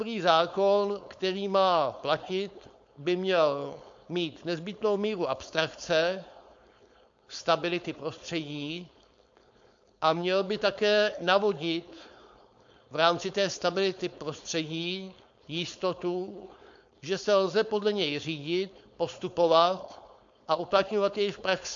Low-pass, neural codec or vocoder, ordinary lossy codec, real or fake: 7.2 kHz; codec, 16 kHz, 4.8 kbps, FACodec; AAC, 64 kbps; fake